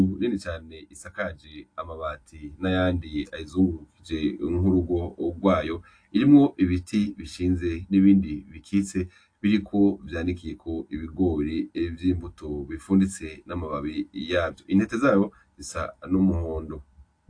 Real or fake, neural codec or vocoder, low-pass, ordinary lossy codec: real; none; 9.9 kHz; AAC, 48 kbps